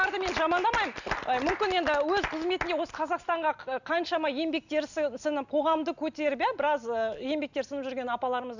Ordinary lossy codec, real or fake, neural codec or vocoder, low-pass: none; real; none; 7.2 kHz